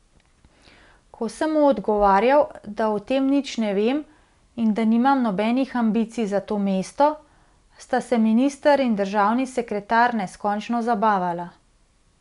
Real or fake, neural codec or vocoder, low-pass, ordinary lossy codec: real; none; 10.8 kHz; none